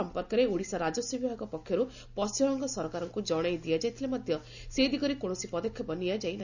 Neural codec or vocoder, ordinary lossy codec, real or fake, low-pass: none; none; real; 7.2 kHz